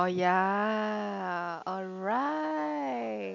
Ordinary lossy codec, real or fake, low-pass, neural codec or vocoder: none; real; 7.2 kHz; none